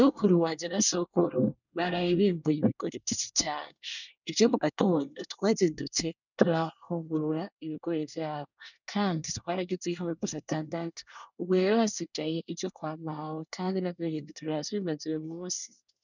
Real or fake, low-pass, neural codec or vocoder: fake; 7.2 kHz; codec, 24 kHz, 1 kbps, SNAC